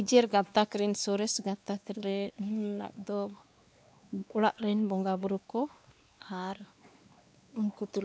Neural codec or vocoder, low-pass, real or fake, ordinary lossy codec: codec, 16 kHz, 2 kbps, X-Codec, WavLM features, trained on Multilingual LibriSpeech; none; fake; none